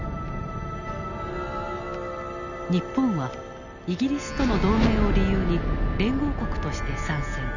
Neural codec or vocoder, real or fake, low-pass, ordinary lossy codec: none; real; 7.2 kHz; none